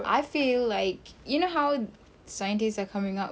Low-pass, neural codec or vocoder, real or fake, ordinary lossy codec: none; none; real; none